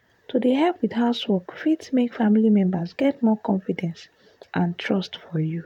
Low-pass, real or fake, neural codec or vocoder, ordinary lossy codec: 19.8 kHz; fake; vocoder, 44.1 kHz, 128 mel bands, Pupu-Vocoder; none